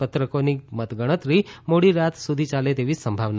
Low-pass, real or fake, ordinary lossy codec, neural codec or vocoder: none; real; none; none